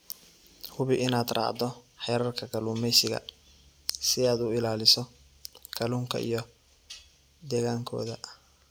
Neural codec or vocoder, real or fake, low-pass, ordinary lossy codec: none; real; none; none